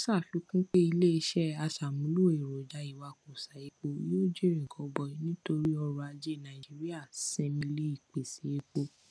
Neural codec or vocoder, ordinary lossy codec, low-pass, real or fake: none; none; none; real